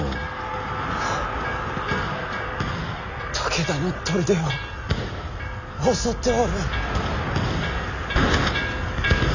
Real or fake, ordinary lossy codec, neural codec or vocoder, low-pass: real; none; none; 7.2 kHz